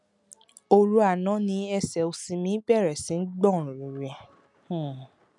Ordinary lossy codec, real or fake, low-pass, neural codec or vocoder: none; real; 10.8 kHz; none